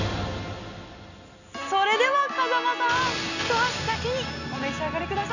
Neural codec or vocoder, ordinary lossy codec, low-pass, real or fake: none; none; 7.2 kHz; real